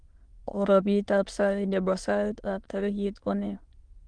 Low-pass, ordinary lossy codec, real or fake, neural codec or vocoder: 9.9 kHz; Opus, 24 kbps; fake; autoencoder, 22.05 kHz, a latent of 192 numbers a frame, VITS, trained on many speakers